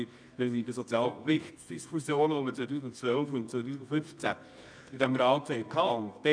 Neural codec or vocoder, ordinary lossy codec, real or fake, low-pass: codec, 24 kHz, 0.9 kbps, WavTokenizer, medium music audio release; none; fake; 9.9 kHz